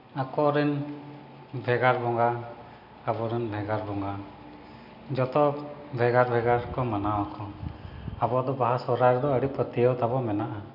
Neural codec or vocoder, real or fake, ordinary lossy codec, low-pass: none; real; none; 5.4 kHz